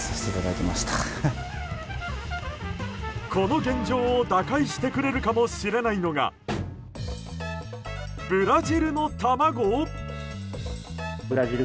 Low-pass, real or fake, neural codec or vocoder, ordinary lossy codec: none; real; none; none